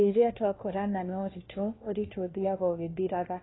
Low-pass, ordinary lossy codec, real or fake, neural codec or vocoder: 7.2 kHz; AAC, 16 kbps; fake; codec, 16 kHz, 1 kbps, FunCodec, trained on LibriTTS, 50 frames a second